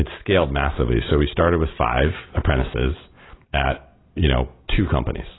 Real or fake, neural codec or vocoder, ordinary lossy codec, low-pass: real; none; AAC, 16 kbps; 7.2 kHz